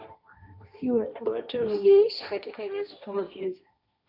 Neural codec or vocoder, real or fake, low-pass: codec, 16 kHz, 1.1 kbps, Voila-Tokenizer; fake; 5.4 kHz